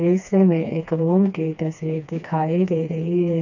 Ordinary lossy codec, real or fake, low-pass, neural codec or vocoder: none; fake; 7.2 kHz; codec, 16 kHz, 2 kbps, FreqCodec, smaller model